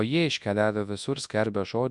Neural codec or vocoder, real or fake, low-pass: codec, 24 kHz, 0.9 kbps, WavTokenizer, large speech release; fake; 10.8 kHz